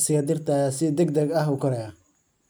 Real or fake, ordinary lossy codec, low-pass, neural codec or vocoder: real; none; none; none